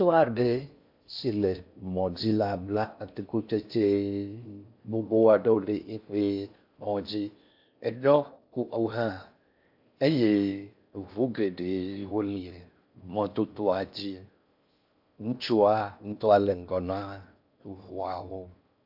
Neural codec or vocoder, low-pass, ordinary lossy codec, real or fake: codec, 16 kHz in and 24 kHz out, 0.6 kbps, FocalCodec, streaming, 4096 codes; 5.4 kHz; AAC, 48 kbps; fake